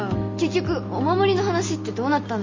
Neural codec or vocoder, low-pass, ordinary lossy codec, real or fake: none; 7.2 kHz; MP3, 32 kbps; real